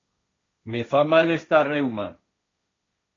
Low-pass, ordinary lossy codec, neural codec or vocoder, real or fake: 7.2 kHz; AAC, 32 kbps; codec, 16 kHz, 1.1 kbps, Voila-Tokenizer; fake